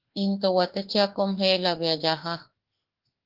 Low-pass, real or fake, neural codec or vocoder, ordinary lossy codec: 5.4 kHz; fake; autoencoder, 48 kHz, 32 numbers a frame, DAC-VAE, trained on Japanese speech; Opus, 16 kbps